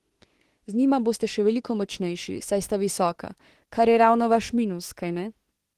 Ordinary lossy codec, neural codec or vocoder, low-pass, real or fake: Opus, 16 kbps; autoencoder, 48 kHz, 32 numbers a frame, DAC-VAE, trained on Japanese speech; 14.4 kHz; fake